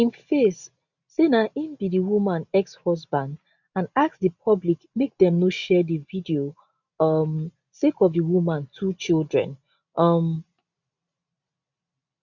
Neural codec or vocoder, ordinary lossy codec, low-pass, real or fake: none; none; 7.2 kHz; real